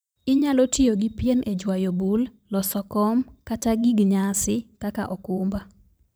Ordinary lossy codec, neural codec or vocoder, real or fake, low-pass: none; vocoder, 44.1 kHz, 128 mel bands every 512 samples, BigVGAN v2; fake; none